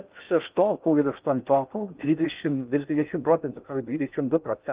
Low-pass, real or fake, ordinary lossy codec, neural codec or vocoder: 3.6 kHz; fake; Opus, 32 kbps; codec, 16 kHz in and 24 kHz out, 0.6 kbps, FocalCodec, streaming, 4096 codes